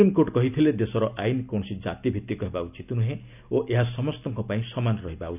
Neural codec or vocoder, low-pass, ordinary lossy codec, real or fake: none; 3.6 kHz; none; real